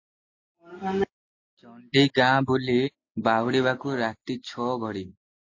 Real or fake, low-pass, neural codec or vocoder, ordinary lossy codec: real; 7.2 kHz; none; AAC, 48 kbps